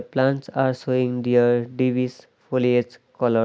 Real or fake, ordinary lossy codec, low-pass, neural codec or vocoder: real; none; none; none